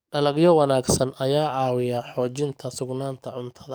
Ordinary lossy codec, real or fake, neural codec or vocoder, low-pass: none; fake; codec, 44.1 kHz, 7.8 kbps, DAC; none